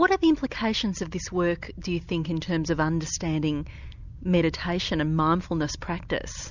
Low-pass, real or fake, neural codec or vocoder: 7.2 kHz; real; none